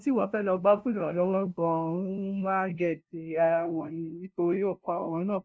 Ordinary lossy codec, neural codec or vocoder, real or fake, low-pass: none; codec, 16 kHz, 0.5 kbps, FunCodec, trained on LibriTTS, 25 frames a second; fake; none